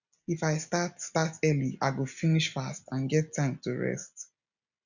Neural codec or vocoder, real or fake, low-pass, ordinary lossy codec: none; real; 7.2 kHz; none